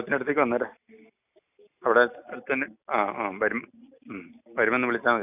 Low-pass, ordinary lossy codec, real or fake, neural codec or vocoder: 3.6 kHz; none; real; none